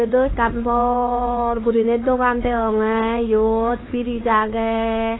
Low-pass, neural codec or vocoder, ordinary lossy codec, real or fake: 7.2 kHz; codec, 16 kHz, 4 kbps, X-Codec, HuBERT features, trained on LibriSpeech; AAC, 16 kbps; fake